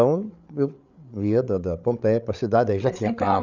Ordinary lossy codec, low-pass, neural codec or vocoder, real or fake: none; 7.2 kHz; codec, 16 kHz, 8 kbps, FreqCodec, larger model; fake